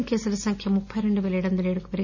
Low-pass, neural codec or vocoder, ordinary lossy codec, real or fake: 7.2 kHz; none; none; real